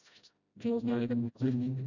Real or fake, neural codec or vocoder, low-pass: fake; codec, 16 kHz, 0.5 kbps, FreqCodec, smaller model; 7.2 kHz